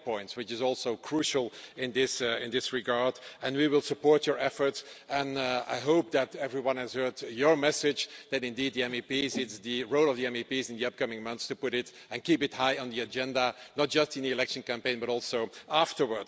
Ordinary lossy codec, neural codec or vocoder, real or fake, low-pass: none; none; real; none